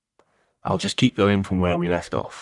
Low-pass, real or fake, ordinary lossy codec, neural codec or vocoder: 10.8 kHz; fake; none; codec, 44.1 kHz, 1.7 kbps, Pupu-Codec